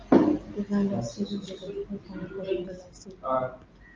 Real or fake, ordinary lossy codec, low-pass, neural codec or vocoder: real; Opus, 32 kbps; 7.2 kHz; none